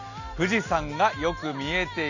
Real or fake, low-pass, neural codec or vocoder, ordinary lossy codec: real; 7.2 kHz; none; none